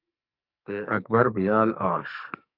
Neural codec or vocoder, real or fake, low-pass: codec, 44.1 kHz, 2.6 kbps, SNAC; fake; 5.4 kHz